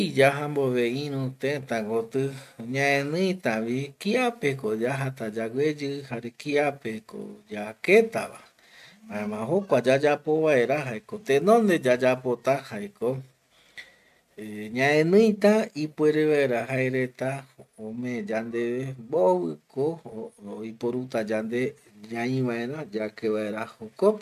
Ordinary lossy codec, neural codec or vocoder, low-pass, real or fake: none; none; 14.4 kHz; real